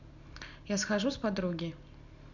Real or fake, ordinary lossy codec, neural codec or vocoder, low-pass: real; none; none; 7.2 kHz